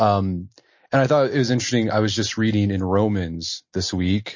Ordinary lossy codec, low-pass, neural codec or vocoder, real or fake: MP3, 32 kbps; 7.2 kHz; none; real